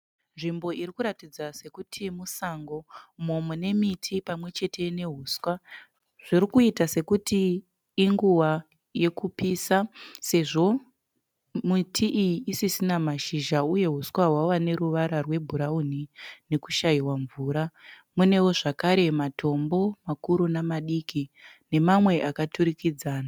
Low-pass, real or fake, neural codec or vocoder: 19.8 kHz; real; none